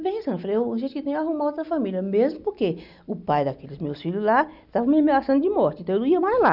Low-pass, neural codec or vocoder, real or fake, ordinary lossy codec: 5.4 kHz; none; real; none